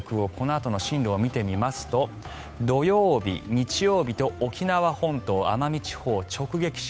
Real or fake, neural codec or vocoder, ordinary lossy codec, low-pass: fake; codec, 16 kHz, 8 kbps, FunCodec, trained on Chinese and English, 25 frames a second; none; none